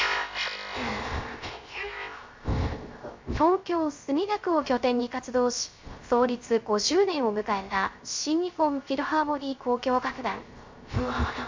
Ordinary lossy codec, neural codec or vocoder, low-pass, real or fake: MP3, 64 kbps; codec, 16 kHz, 0.3 kbps, FocalCodec; 7.2 kHz; fake